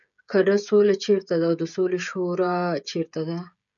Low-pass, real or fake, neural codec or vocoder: 7.2 kHz; fake; codec, 16 kHz, 16 kbps, FreqCodec, smaller model